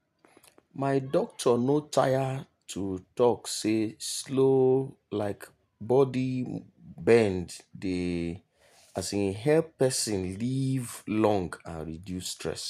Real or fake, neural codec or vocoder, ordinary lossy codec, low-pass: real; none; none; 14.4 kHz